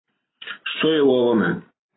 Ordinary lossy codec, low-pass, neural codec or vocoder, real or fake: AAC, 16 kbps; 7.2 kHz; none; real